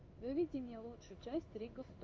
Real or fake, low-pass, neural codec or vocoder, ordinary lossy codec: fake; 7.2 kHz; codec, 16 kHz in and 24 kHz out, 1 kbps, XY-Tokenizer; Opus, 32 kbps